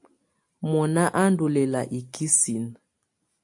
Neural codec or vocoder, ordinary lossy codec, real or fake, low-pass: none; AAC, 64 kbps; real; 10.8 kHz